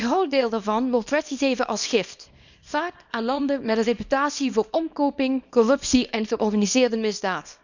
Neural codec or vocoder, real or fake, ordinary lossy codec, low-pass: codec, 24 kHz, 0.9 kbps, WavTokenizer, small release; fake; none; 7.2 kHz